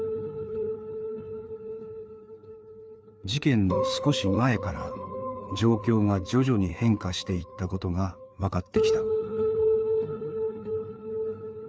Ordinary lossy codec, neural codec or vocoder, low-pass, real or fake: none; codec, 16 kHz, 4 kbps, FreqCodec, larger model; none; fake